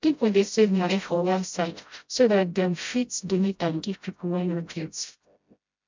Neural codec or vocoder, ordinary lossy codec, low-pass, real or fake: codec, 16 kHz, 0.5 kbps, FreqCodec, smaller model; MP3, 64 kbps; 7.2 kHz; fake